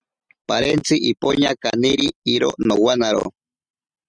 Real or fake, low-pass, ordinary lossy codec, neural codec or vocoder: real; 9.9 kHz; Opus, 64 kbps; none